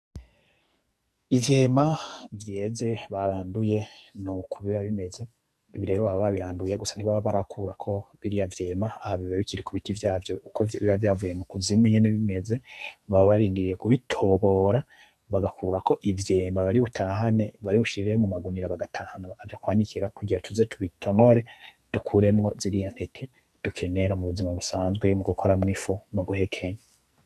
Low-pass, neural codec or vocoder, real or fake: 14.4 kHz; codec, 44.1 kHz, 2.6 kbps, SNAC; fake